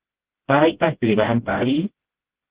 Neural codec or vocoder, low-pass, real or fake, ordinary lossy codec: codec, 16 kHz, 0.5 kbps, FreqCodec, smaller model; 3.6 kHz; fake; Opus, 16 kbps